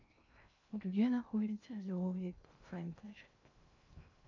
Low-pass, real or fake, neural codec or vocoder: 7.2 kHz; fake; codec, 16 kHz in and 24 kHz out, 0.6 kbps, FocalCodec, streaming, 2048 codes